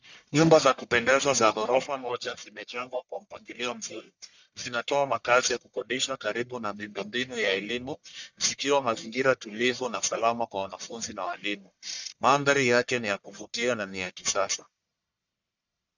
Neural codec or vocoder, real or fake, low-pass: codec, 44.1 kHz, 1.7 kbps, Pupu-Codec; fake; 7.2 kHz